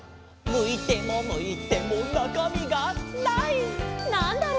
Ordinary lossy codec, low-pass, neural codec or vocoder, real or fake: none; none; none; real